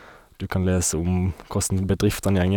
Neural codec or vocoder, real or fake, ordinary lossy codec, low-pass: none; real; none; none